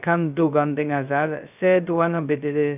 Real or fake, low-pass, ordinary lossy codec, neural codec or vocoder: fake; 3.6 kHz; none; codec, 16 kHz, 0.2 kbps, FocalCodec